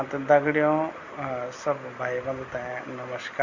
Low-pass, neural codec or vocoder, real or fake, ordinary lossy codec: 7.2 kHz; none; real; Opus, 64 kbps